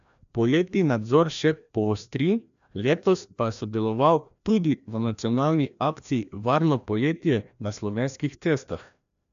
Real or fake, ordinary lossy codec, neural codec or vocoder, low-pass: fake; AAC, 96 kbps; codec, 16 kHz, 1 kbps, FreqCodec, larger model; 7.2 kHz